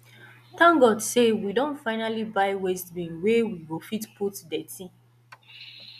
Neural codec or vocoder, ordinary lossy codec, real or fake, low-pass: none; none; real; 14.4 kHz